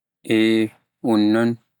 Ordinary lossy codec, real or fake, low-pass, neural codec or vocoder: none; real; 19.8 kHz; none